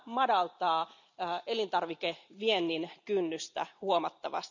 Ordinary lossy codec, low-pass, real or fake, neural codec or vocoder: none; 7.2 kHz; real; none